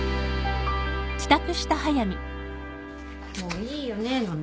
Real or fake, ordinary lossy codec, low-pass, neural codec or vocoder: real; none; none; none